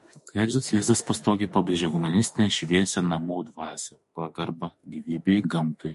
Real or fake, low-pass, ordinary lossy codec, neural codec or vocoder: fake; 14.4 kHz; MP3, 48 kbps; autoencoder, 48 kHz, 32 numbers a frame, DAC-VAE, trained on Japanese speech